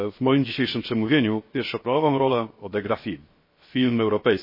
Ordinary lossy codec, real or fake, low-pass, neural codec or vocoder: MP3, 24 kbps; fake; 5.4 kHz; codec, 16 kHz, 0.7 kbps, FocalCodec